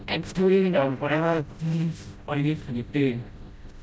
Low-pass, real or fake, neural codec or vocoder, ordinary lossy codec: none; fake; codec, 16 kHz, 0.5 kbps, FreqCodec, smaller model; none